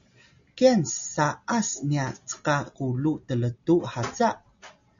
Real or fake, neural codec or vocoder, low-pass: real; none; 7.2 kHz